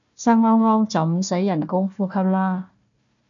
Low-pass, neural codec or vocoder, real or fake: 7.2 kHz; codec, 16 kHz, 1 kbps, FunCodec, trained on Chinese and English, 50 frames a second; fake